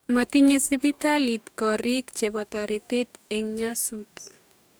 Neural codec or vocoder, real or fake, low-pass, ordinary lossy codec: codec, 44.1 kHz, 2.6 kbps, DAC; fake; none; none